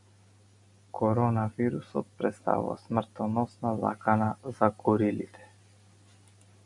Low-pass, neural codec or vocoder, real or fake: 10.8 kHz; none; real